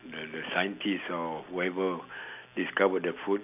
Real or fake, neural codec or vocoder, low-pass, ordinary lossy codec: real; none; 3.6 kHz; none